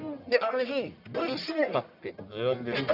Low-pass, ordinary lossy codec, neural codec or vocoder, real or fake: 5.4 kHz; none; codec, 44.1 kHz, 1.7 kbps, Pupu-Codec; fake